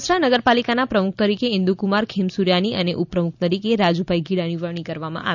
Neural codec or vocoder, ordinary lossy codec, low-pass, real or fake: none; none; 7.2 kHz; real